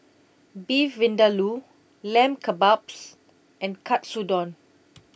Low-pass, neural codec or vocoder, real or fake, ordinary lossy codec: none; none; real; none